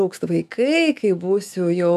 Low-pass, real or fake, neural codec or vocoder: 14.4 kHz; fake; autoencoder, 48 kHz, 128 numbers a frame, DAC-VAE, trained on Japanese speech